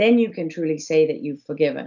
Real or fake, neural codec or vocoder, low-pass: real; none; 7.2 kHz